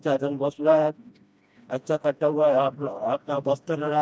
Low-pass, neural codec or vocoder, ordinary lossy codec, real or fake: none; codec, 16 kHz, 1 kbps, FreqCodec, smaller model; none; fake